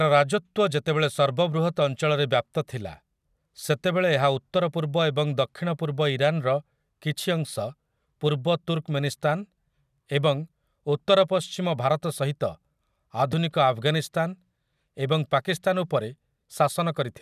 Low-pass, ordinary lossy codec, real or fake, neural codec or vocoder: 14.4 kHz; none; real; none